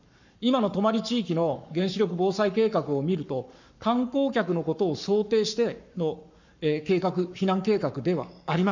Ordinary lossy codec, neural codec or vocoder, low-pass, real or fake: none; codec, 44.1 kHz, 7.8 kbps, Pupu-Codec; 7.2 kHz; fake